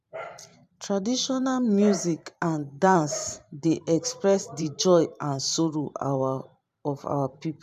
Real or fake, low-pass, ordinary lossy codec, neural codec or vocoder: fake; 14.4 kHz; none; vocoder, 44.1 kHz, 128 mel bands every 512 samples, BigVGAN v2